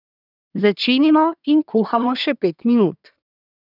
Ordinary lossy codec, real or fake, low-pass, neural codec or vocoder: none; fake; 5.4 kHz; codec, 24 kHz, 1 kbps, SNAC